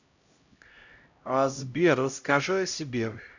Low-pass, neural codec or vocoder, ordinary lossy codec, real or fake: 7.2 kHz; codec, 16 kHz, 0.5 kbps, X-Codec, HuBERT features, trained on LibriSpeech; none; fake